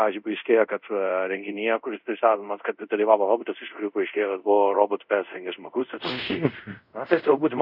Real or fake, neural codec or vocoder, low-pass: fake; codec, 24 kHz, 0.5 kbps, DualCodec; 5.4 kHz